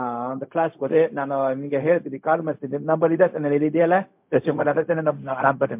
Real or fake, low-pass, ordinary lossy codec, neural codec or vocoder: fake; 3.6 kHz; MP3, 32 kbps; codec, 16 kHz, 0.4 kbps, LongCat-Audio-Codec